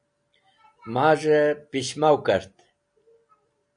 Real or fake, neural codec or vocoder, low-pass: real; none; 9.9 kHz